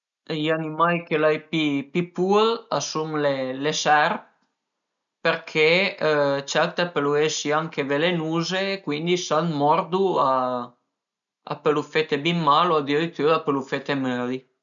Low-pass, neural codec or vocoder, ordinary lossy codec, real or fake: 7.2 kHz; none; none; real